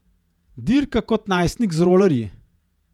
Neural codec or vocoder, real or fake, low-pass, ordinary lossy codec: vocoder, 48 kHz, 128 mel bands, Vocos; fake; 19.8 kHz; none